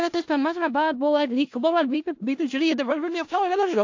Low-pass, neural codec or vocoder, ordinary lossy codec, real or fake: 7.2 kHz; codec, 16 kHz in and 24 kHz out, 0.4 kbps, LongCat-Audio-Codec, four codebook decoder; AAC, 48 kbps; fake